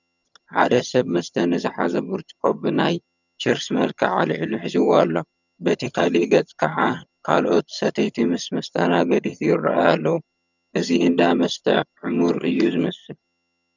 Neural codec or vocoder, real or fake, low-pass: vocoder, 22.05 kHz, 80 mel bands, HiFi-GAN; fake; 7.2 kHz